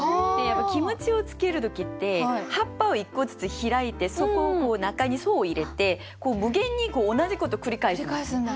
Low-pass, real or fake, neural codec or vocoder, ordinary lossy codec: none; real; none; none